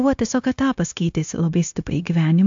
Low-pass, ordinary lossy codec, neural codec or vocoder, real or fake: 7.2 kHz; AAC, 64 kbps; codec, 16 kHz, 0.9 kbps, LongCat-Audio-Codec; fake